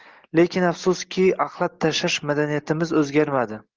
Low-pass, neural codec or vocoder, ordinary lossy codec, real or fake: 7.2 kHz; none; Opus, 16 kbps; real